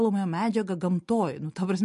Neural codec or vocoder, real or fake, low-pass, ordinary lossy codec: none; real; 14.4 kHz; MP3, 48 kbps